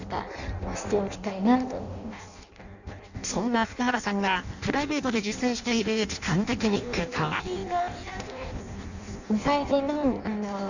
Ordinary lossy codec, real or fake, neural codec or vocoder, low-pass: none; fake; codec, 16 kHz in and 24 kHz out, 0.6 kbps, FireRedTTS-2 codec; 7.2 kHz